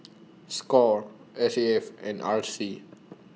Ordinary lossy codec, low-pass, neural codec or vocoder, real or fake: none; none; none; real